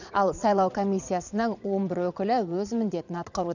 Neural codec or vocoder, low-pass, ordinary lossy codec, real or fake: vocoder, 22.05 kHz, 80 mel bands, Vocos; 7.2 kHz; none; fake